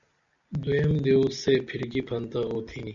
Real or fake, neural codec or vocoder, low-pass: real; none; 7.2 kHz